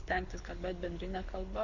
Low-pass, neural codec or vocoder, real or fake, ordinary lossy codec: 7.2 kHz; codec, 24 kHz, 6 kbps, HILCodec; fake; Opus, 64 kbps